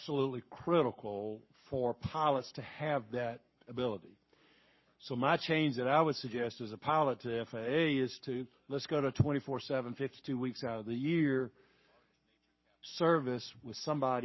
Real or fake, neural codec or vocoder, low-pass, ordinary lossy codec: fake; codec, 44.1 kHz, 7.8 kbps, Pupu-Codec; 7.2 kHz; MP3, 24 kbps